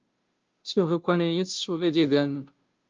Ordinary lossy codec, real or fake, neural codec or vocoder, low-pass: Opus, 24 kbps; fake; codec, 16 kHz, 0.5 kbps, FunCodec, trained on Chinese and English, 25 frames a second; 7.2 kHz